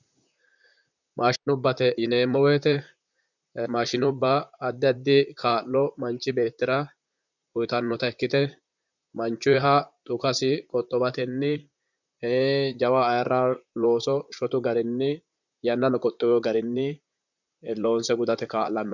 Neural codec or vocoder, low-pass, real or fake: vocoder, 44.1 kHz, 128 mel bands, Pupu-Vocoder; 7.2 kHz; fake